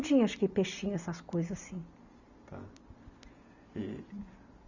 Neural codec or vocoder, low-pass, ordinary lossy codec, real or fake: none; 7.2 kHz; none; real